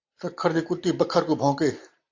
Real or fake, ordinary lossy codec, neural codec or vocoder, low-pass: real; AAC, 48 kbps; none; 7.2 kHz